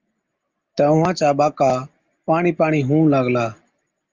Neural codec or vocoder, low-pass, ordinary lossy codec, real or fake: none; 7.2 kHz; Opus, 32 kbps; real